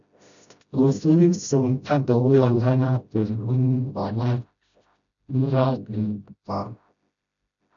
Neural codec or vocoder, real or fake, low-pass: codec, 16 kHz, 0.5 kbps, FreqCodec, smaller model; fake; 7.2 kHz